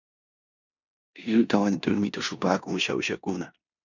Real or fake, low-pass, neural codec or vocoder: fake; 7.2 kHz; codec, 16 kHz in and 24 kHz out, 0.9 kbps, LongCat-Audio-Codec, four codebook decoder